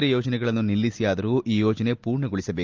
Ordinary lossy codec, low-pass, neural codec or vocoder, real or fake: Opus, 32 kbps; 7.2 kHz; none; real